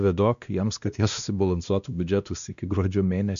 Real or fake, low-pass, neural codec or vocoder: fake; 7.2 kHz; codec, 16 kHz, 1 kbps, X-Codec, WavLM features, trained on Multilingual LibriSpeech